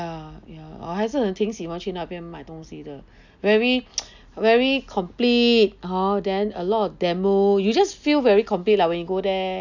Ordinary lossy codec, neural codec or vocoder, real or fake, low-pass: none; none; real; 7.2 kHz